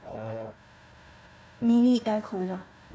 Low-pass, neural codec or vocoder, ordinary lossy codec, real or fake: none; codec, 16 kHz, 1 kbps, FunCodec, trained on Chinese and English, 50 frames a second; none; fake